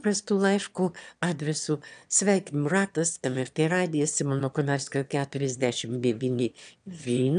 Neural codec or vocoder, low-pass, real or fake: autoencoder, 22.05 kHz, a latent of 192 numbers a frame, VITS, trained on one speaker; 9.9 kHz; fake